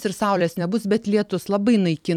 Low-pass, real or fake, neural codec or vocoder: 19.8 kHz; fake; vocoder, 44.1 kHz, 128 mel bands every 256 samples, BigVGAN v2